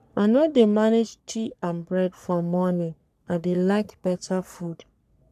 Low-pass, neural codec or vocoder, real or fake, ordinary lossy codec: 14.4 kHz; codec, 44.1 kHz, 3.4 kbps, Pupu-Codec; fake; none